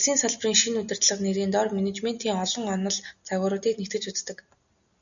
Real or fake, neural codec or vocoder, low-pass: real; none; 7.2 kHz